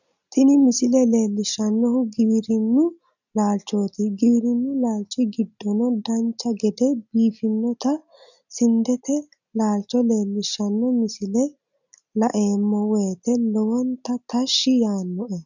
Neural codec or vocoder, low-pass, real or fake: none; 7.2 kHz; real